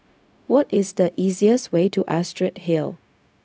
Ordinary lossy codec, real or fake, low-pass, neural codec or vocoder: none; fake; none; codec, 16 kHz, 0.4 kbps, LongCat-Audio-Codec